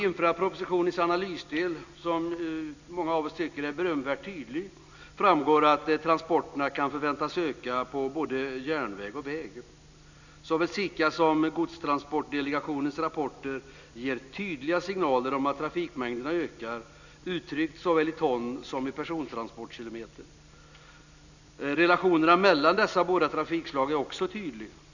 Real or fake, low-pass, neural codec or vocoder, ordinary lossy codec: real; 7.2 kHz; none; none